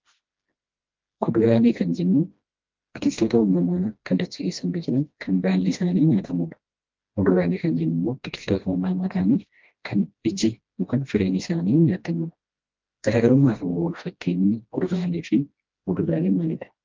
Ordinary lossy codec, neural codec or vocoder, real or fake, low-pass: Opus, 32 kbps; codec, 16 kHz, 1 kbps, FreqCodec, smaller model; fake; 7.2 kHz